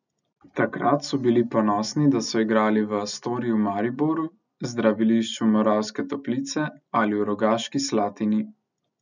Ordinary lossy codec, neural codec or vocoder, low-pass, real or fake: none; none; 7.2 kHz; real